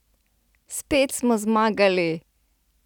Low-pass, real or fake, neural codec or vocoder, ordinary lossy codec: 19.8 kHz; real; none; none